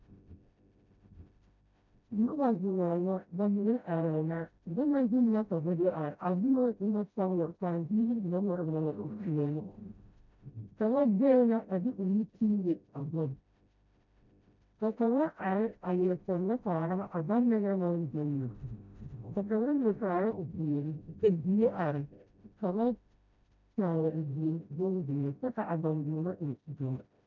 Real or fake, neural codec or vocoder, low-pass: fake; codec, 16 kHz, 0.5 kbps, FreqCodec, smaller model; 7.2 kHz